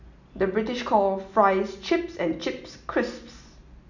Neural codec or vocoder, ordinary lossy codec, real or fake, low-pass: none; none; real; 7.2 kHz